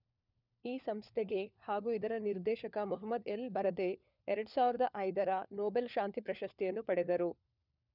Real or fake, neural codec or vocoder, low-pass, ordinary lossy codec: fake; codec, 16 kHz, 4 kbps, FunCodec, trained on LibriTTS, 50 frames a second; 5.4 kHz; none